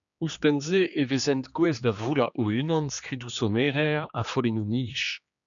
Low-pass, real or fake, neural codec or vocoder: 7.2 kHz; fake; codec, 16 kHz, 2 kbps, X-Codec, HuBERT features, trained on general audio